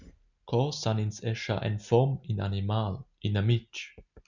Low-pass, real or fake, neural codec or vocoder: 7.2 kHz; real; none